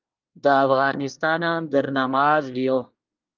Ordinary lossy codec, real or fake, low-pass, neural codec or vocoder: Opus, 24 kbps; fake; 7.2 kHz; codec, 24 kHz, 1 kbps, SNAC